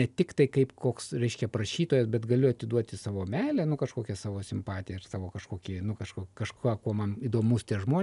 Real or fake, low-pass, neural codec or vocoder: real; 10.8 kHz; none